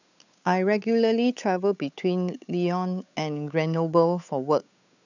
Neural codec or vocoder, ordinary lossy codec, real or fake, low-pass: codec, 16 kHz, 8 kbps, FunCodec, trained on Chinese and English, 25 frames a second; none; fake; 7.2 kHz